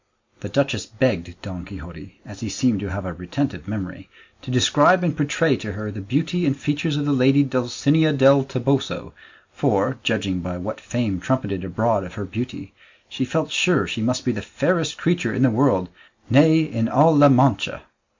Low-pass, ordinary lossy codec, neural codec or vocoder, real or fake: 7.2 kHz; MP3, 64 kbps; none; real